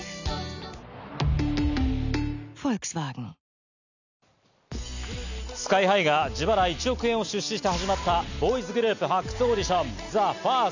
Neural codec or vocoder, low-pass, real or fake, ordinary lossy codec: none; 7.2 kHz; real; none